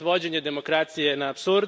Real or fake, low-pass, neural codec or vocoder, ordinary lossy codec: real; none; none; none